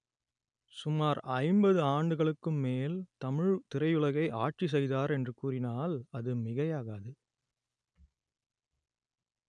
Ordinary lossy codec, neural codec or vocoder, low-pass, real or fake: none; none; 9.9 kHz; real